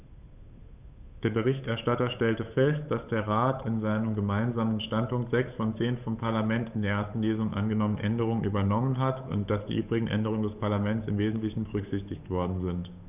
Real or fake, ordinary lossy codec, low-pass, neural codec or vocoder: fake; none; 3.6 kHz; codec, 16 kHz, 8 kbps, FunCodec, trained on Chinese and English, 25 frames a second